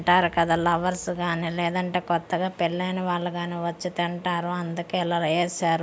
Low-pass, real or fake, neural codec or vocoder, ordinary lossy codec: none; real; none; none